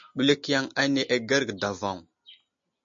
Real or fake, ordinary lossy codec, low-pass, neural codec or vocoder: real; MP3, 48 kbps; 7.2 kHz; none